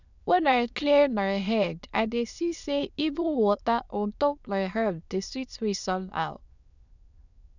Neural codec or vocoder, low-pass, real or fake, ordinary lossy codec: autoencoder, 22.05 kHz, a latent of 192 numbers a frame, VITS, trained on many speakers; 7.2 kHz; fake; none